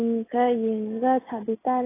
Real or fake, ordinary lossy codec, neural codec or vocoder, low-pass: real; AAC, 16 kbps; none; 3.6 kHz